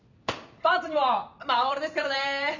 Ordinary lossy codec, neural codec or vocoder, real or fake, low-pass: none; vocoder, 44.1 kHz, 128 mel bands every 256 samples, BigVGAN v2; fake; 7.2 kHz